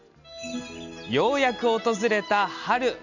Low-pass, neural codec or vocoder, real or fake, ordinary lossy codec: 7.2 kHz; none; real; none